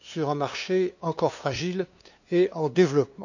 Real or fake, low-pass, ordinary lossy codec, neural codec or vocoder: fake; 7.2 kHz; none; codec, 16 kHz, 2 kbps, FunCodec, trained on LibriTTS, 25 frames a second